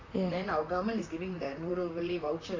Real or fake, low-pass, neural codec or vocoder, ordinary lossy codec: fake; 7.2 kHz; vocoder, 44.1 kHz, 128 mel bands, Pupu-Vocoder; AAC, 32 kbps